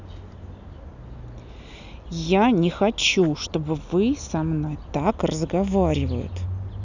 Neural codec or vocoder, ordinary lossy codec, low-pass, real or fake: none; none; 7.2 kHz; real